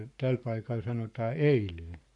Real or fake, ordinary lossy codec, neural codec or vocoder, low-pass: fake; MP3, 64 kbps; codec, 44.1 kHz, 7.8 kbps, Pupu-Codec; 10.8 kHz